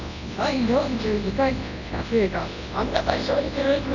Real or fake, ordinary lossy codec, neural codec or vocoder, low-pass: fake; none; codec, 24 kHz, 0.9 kbps, WavTokenizer, large speech release; 7.2 kHz